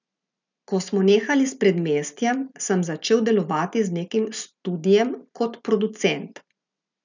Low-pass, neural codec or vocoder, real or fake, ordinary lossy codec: 7.2 kHz; none; real; none